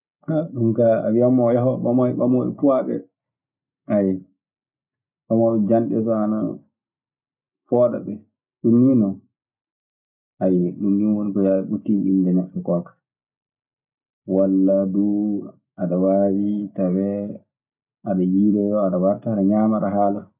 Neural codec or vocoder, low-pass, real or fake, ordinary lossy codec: none; 3.6 kHz; real; none